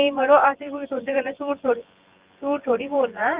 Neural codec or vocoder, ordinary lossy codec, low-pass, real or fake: vocoder, 24 kHz, 100 mel bands, Vocos; Opus, 32 kbps; 3.6 kHz; fake